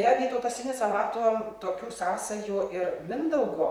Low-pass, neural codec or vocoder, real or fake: 19.8 kHz; vocoder, 44.1 kHz, 128 mel bands, Pupu-Vocoder; fake